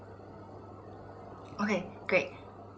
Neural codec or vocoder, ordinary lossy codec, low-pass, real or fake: none; none; none; real